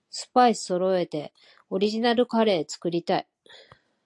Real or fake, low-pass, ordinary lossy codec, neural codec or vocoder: real; 10.8 kHz; AAC, 64 kbps; none